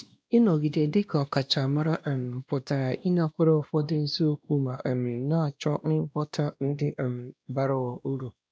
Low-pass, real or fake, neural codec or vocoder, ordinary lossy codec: none; fake; codec, 16 kHz, 1 kbps, X-Codec, WavLM features, trained on Multilingual LibriSpeech; none